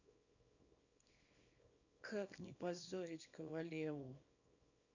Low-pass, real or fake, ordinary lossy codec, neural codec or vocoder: 7.2 kHz; fake; none; codec, 24 kHz, 0.9 kbps, WavTokenizer, small release